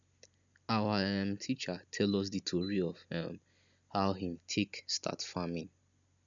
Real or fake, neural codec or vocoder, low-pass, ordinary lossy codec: real; none; 7.2 kHz; none